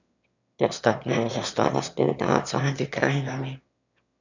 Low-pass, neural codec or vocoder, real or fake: 7.2 kHz; autoencoder, 22.05 kHz, a latent of 192 numbers a frame, VITS, trained on one speaker; fake